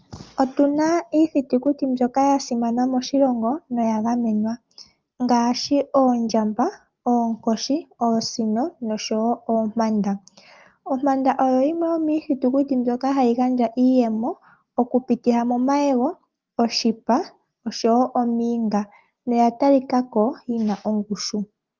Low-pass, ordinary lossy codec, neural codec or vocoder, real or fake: 7.2 kHz; Opus, 32 kbps; none; real